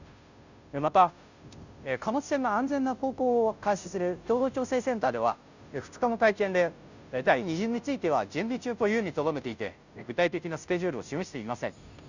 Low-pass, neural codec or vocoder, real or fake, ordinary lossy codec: 7.2 kHz; codec, 16 kHz, 0.5 kbps, FunCodec, trained on Chinese and English, 25 frames a second; fake; none